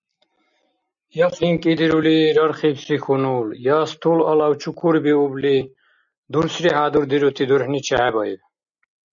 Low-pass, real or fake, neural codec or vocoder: 7.2 kHz; real; none